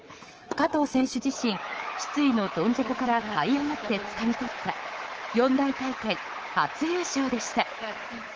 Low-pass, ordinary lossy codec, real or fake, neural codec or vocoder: 7.2 kHz; Opus, 16 kbps; fake; codec, 24 kHz, 3.1 kbps, DualCodec